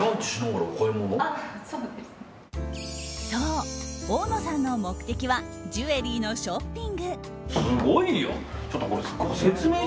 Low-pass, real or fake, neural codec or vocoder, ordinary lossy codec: none; real; none; none